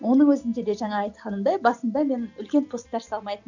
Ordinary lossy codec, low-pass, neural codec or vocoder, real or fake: none; 7.2 kHz; none; real